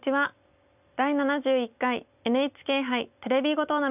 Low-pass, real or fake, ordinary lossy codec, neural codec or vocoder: 3.6 kHz; real; none; none